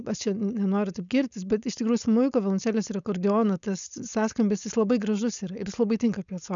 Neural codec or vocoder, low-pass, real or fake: codec, 16 kHz, 4.8 kbps, FACodec; 7.2 kHz; fake